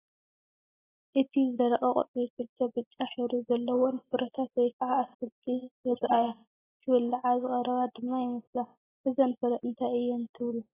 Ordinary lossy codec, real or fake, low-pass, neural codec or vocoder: AAC, 16 kbps; real; 3.6 kHz; none